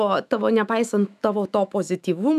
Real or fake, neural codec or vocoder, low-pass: fake; autoencoder, 48 kHz, 128 numbers a frame, DAC-VAE, trained on Japanese speech; 14.4 kHz